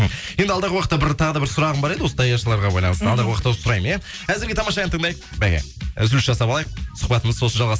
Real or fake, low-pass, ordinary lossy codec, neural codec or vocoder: real; none; none; none